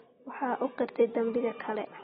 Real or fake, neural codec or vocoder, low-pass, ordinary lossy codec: real; none; 19.8 kHz; AAC, 16 kbps